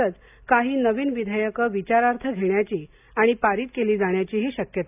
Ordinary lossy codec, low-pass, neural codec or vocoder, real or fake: AAC, 32 kbps; 3.6 kHz; none; real